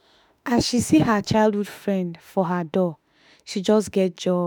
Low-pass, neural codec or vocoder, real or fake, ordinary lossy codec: none; autoencoder, 48 kHz, 32 numbers a frame, DAC-VAE, trained on Japanese speech; fake; none